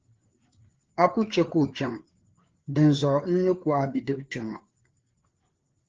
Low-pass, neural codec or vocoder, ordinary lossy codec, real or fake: 7.2 kHz; codec, 16 kHz, 4 kbps, FreqCodec, larger model; Opus, 16 kbps; fake